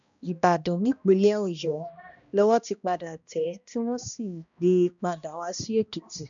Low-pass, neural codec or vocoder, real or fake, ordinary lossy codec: 7.2 kHz; codec, 16 kHz, 1 kbps, X-Codec, HuBERT features, trained on balanced general audio; fake; none